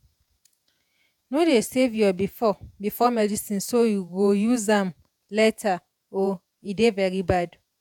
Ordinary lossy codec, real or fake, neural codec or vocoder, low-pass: none; fake; vocoder, 48 kHz, 128 mel bands, Vocos; 19.8 kHz